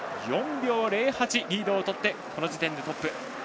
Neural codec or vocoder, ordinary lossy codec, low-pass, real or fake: none; none; none; real